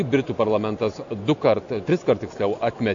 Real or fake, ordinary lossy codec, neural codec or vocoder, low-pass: real; AAC, 64 kbps; none; 7.2 kHz